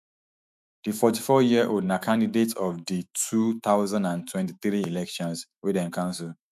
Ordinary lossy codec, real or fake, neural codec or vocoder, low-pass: none; fake; autoencoder, 48 kHz, 128 numbers a frame, DAC-VAE, trained on Japanese speech; 14.4 kHz